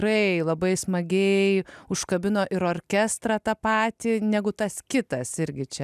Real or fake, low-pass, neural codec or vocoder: real; 14.4 kHz; none